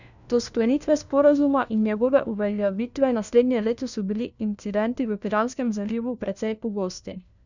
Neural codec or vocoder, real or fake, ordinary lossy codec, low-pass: codec, 16 kHz, 1 kbps, FunCodec, trained on LibriTTS, 50 frames a second; fake; none; 7.2 kHz